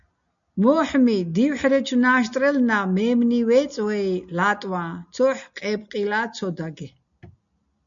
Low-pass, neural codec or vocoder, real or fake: 7.2 kHz; none; real